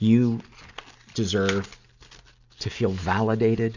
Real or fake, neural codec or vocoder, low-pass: real; none; 7.2 kHz